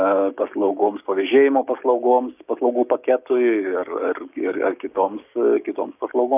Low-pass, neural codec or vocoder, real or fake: 3.6 kHz; codec, 16 kHz, 6 kbps, DAC; fake